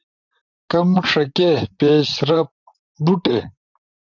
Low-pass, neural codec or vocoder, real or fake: 7.2 kHz; vocoder, 44.1 kHz, 128 mel bands, Pupu-Vocoder; fake